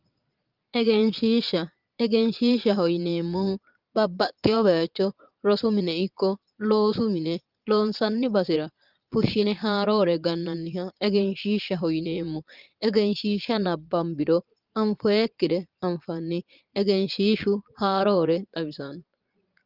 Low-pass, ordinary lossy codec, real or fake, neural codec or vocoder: 5.4 kHz; Opus, 24 kbps; fake; vocoder, 22.05 kHz, 80 mel bands, Vocos